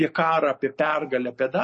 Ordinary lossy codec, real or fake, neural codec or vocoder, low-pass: MP3, 32 kbps; real; none; 10.8 kHz